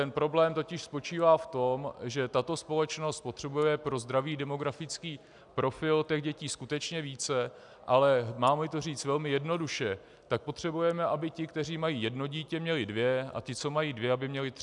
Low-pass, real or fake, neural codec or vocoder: 10.8 kHz; real; none